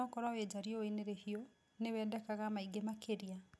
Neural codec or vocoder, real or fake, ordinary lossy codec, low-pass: none; real; none; none